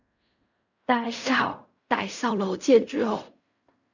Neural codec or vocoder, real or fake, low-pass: codec, 16 kHz in and 24 kHz out, 0.4 kbps, LongCat-Audio-Codec, fine tuned four codebook decoder; fake; 7.2 kHz